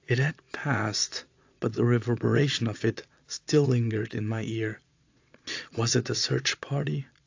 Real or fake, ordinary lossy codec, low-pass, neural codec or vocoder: fake; AAC, 48 kbps; 7.2 kHz; vocoder, 22.05 kHz, 80 mel bands, Vocos